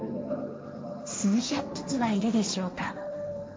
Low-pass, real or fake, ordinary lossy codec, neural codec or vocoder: none; fake; none; codec, 16 kHz, 1.1 kbps, Voila-Tokenizer